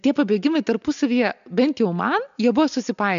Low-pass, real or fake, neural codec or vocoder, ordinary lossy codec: 7.2 kHz; real; none; AAC, 96 kbps